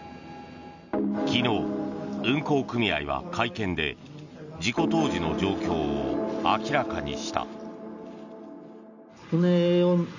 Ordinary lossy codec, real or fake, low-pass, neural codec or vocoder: none; real; 7.2 kHz; none